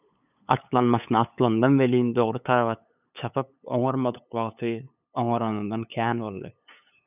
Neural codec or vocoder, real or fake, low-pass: codec, 16 kHz, 8 kbps, FunCodec, trained on LibriTTS, 25 frames a second; fake; 3.6 kHz